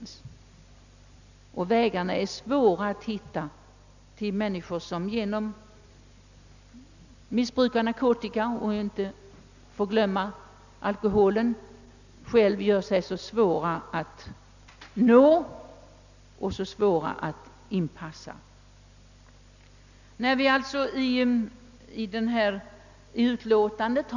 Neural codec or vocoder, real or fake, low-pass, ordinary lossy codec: none; real; 7.2 kHz; none